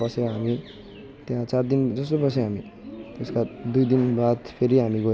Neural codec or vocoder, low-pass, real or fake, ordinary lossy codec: none; none; real; none